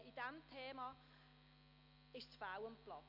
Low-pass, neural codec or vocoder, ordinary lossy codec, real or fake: 5.4 kHz; none; none; real